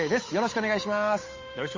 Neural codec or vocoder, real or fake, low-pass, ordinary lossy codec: codec, 16 kHz, 8 kbps, FunCodec, trained on Chinese and English, 25 frames a second; fake; 7.2 kHz; MP3, 32 kbps